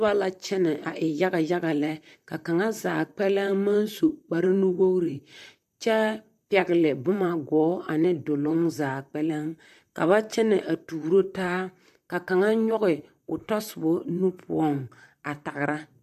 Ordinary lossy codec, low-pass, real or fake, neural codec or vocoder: AAC, 96 kbps; 14.4 kHz; fake; vocoder, 44.1 kHz, 128 mel bands, Pupu-Vocoder